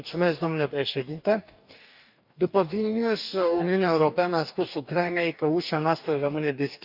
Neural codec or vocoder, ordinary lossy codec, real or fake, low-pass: codec, 44.1 kHz, 2.6 kbps, DAC; none; fake; 5.4 kHz